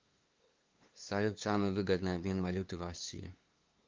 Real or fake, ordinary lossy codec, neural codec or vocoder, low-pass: fake; Opus, 32 kbps; codec, 16 kHz, 2 kbps, FunCodec, trained on LibriTTS, 25 frames a second; 7.2 kHz